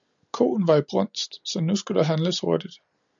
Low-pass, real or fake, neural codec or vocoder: 7.2 kHz; real; none